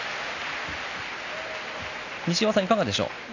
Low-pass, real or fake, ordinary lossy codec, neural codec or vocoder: 7.2 kHz; real; none; none